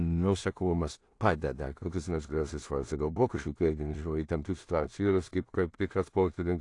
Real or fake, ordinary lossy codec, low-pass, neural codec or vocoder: fake; AAC, 48 kbps; 10.8 kHz; codec, 16 kHz in and 24 kHz out, 0.4 kbps, LongCat-Audio-Codec, two codebook decoder